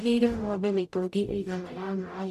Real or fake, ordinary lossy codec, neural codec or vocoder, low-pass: fake; none; codec, 44.1 kHz, 0.9 kbps, DAC; 14.4 kHz